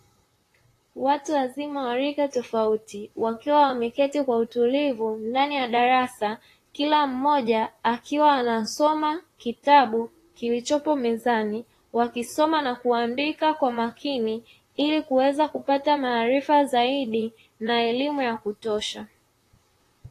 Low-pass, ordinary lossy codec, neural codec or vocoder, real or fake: 14.4 kHz; AAC, 48 kbps; vocoder, 44.1 kHz, 128 mel bands, Pupu-Vocoder; fake